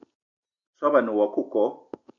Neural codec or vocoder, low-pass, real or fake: none; 7.2 kHz; real